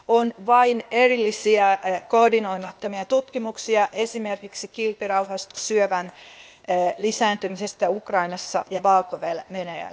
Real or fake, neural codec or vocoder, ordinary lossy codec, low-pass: fake; codec, 16 kHz, 0.8 kbps, ZipCodec; none; none